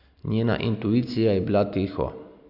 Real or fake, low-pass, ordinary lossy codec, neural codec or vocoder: real; 5.4 kHz; none; none